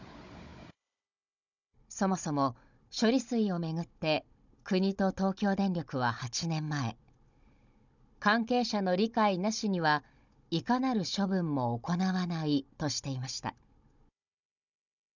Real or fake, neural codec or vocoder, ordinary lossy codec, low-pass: fake; codec, 16 kHz, 16 kbps, FunCodec, trained on Chinese and English, 50 frames a second; none; 7.2 kHz